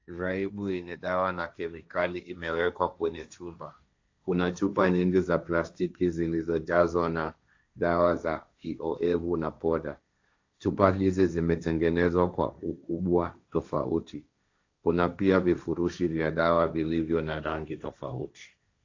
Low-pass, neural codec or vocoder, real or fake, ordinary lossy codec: 7.2 kHz; codec, 16 kHz, 1.1 kbps, Voila-Tokenizer; fake; AAC, 48 kbps